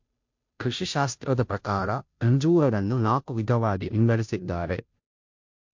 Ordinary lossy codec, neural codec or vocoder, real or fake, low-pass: MP3, 48 kbps; codec, 16 kHz, 0.5 kbps, FunCodec, trained on Chinese and English, 25 frames a second; fake; 7.2 kHz